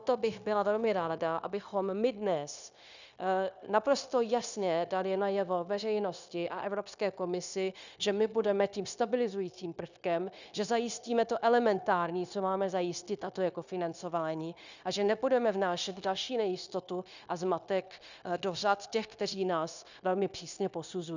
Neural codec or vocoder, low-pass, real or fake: codec, 16 kHz, 0.9 kbps, LongCat-Audio-Codec; 7.2 kHz; fake